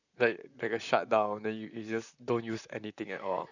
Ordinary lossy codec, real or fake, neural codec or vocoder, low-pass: none; fake; vocoder, 44.1 kHz, 128 mel bands, Pupu-Vocoder; 7.2 kHz